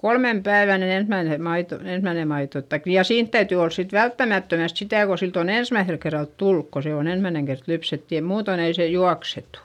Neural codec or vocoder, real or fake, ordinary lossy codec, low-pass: none; real; none; 19.8 kHz